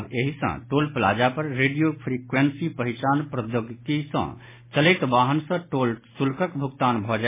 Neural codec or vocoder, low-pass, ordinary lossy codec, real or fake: none; 3.6 kHz; MP3, 16 kbps; real